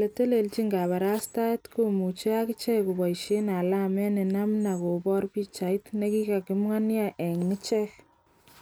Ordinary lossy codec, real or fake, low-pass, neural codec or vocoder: none; real; none; none